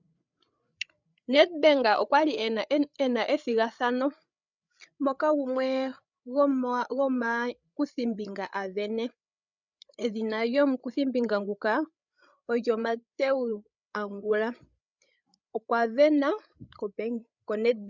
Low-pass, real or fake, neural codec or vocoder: 7.2 kHz; fake; codec, 16 kHz, 8 kbps, FreqCodec, larger model